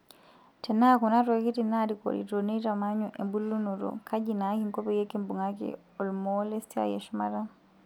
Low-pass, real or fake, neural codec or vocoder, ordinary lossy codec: 19.8 kHz; real; none; none